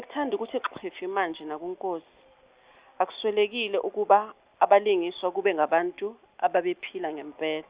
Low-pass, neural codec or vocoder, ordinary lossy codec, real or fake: 3.6 kHz; none; Opus, 64 kbps; real